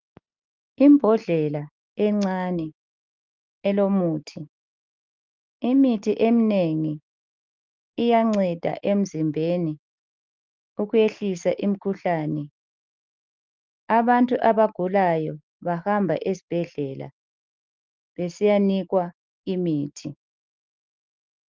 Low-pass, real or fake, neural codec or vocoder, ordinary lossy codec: 7.2 kHz; real; none; Opus, 32 kbps